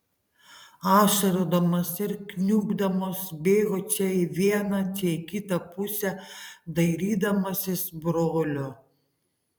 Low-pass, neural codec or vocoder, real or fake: 19.8 kHz; vocoder, 44.1 kHz, 128 mel bands every 512 samples, BigVGAN v2; fake